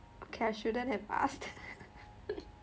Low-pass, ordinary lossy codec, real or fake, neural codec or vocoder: none; none; real; none